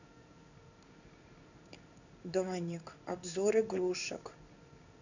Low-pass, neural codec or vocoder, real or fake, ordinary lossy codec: 7.2 kHz; codec, 16 kHz in and 24 kHz out, 1 kbps, XY-Tokenizer; fake; none